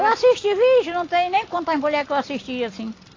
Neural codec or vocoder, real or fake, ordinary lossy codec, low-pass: none; real; AAC, 32 kbps; 7.2 kHz